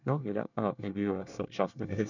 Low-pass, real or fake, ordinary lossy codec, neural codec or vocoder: 7.2 kHz; fake; none; codec, 24 kHz, 1 kbps, SNAC